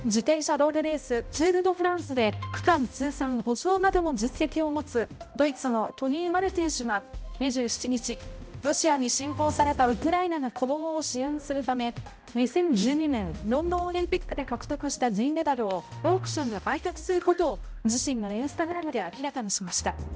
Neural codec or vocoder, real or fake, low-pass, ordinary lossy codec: codec, 16 kHz, 0.5 kbps, X-Codec, HuBERT features, trained on balanced general audio; fake; none; none